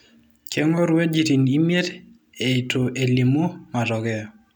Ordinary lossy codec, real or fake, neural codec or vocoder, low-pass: none; real; none; none